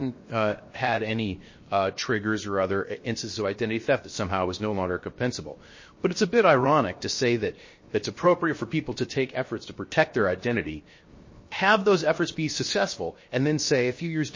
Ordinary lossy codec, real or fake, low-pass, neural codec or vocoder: MP3, 32 kbps; fake; 7.2 kHz; codec, 16 kHz, 0.7 kbps, FocalCodec